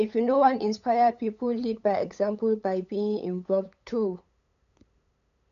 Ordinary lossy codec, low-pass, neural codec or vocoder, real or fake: none; 7.2 kHz; codec, 16 kHz, 8 kbps, FunCodec, trained on LibriTTS, 25 frames a second; fake